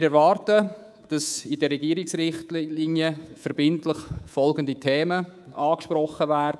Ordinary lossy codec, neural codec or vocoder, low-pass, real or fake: none; codec, 24 kHz, 3.1 kbps, DualCodec; none; fake